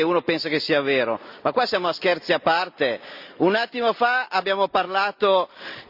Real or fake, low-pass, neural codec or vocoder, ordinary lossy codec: real; 5.4 kHz; none; Opus, 64 kbps